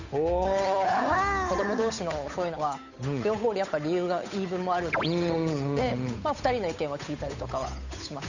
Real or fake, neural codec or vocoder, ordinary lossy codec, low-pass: fake; codec, 16 kHz, 8 kbps, FunCodec, trained on Chinese and English, 25 frames a second; none; 7.2 kHz